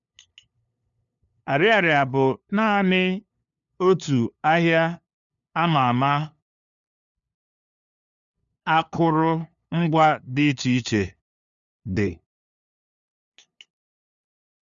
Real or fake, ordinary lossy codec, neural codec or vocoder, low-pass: fake; none; codec, 16 kHz, 2 kbps, FunCodec, trained on LibriTTS, 25 frames a second; 7.2 kHz